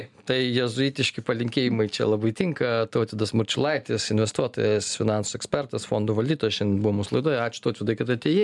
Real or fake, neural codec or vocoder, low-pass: real; none; 10.8 kHz